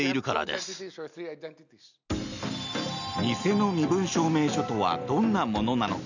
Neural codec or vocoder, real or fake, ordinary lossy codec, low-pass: none; real; none; 7.2 kHz